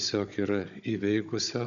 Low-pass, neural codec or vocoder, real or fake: 7.2 kHz; codec, 16 kHz, 16 kbps, FunCodec, trained on Chinese and English, 50 frames a second; fake